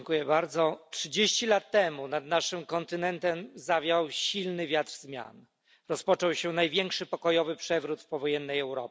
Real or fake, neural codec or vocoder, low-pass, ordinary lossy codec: real; none; none; none